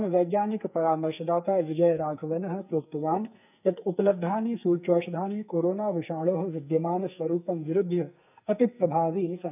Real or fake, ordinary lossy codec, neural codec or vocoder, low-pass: fake; none; codec, 44.1 kHz, 2.6 kbps, SNAC; 3.6 kHz